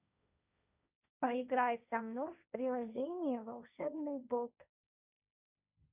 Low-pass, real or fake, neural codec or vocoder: 3.6 kHz; fake; codec, 16 kHz, 1.1 kbps, Voila-Tokenizer